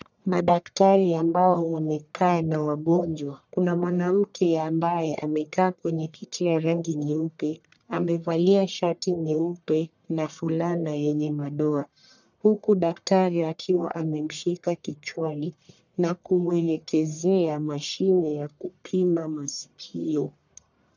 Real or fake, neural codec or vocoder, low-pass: fake; codec, 44.1 kHz, 1.7 kbps, Pupu-Codec; 7.2 kHz